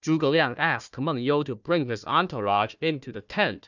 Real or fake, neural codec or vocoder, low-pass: fake; codec, 16 kHz, 1 kbps, FunCodec, trained on Chinese and English, 50 frames a second; 7.2 kHz